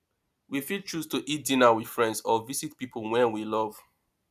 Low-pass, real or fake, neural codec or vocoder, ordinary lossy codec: 14.4 kHz; real; none; none